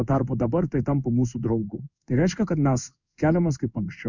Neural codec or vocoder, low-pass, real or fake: codec, 16 kHz in and 24 kHz out, 1 kbps, XY-Tokenizer; 7.2 kHz; fake